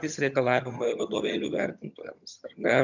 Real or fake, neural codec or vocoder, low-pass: fake; vocoder, 22.05 kHz, 80 mel bands, HiFi-GAN; 7.2 kHz